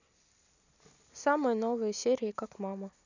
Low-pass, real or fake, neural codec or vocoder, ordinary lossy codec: 7.2 kHz; real; none; none